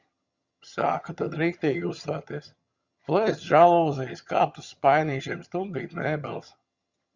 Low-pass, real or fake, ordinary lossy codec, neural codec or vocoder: 7.2 kHz; fake; Opus, 64 kbps; vocoder, 22.05 kHz, 80 mel bands, HiFi-GAN